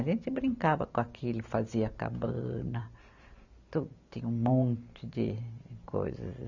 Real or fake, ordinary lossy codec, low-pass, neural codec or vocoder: real; none; 7.2 kHz; none